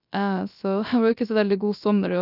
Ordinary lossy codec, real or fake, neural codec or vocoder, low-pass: none; fake; codec, 16 kHz, 0.3 kbps, FocalCodec; 5.4 kHz